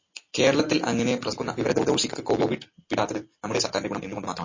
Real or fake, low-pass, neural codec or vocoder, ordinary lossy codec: real; 7.2 kHz; none; MP3, 32 kbps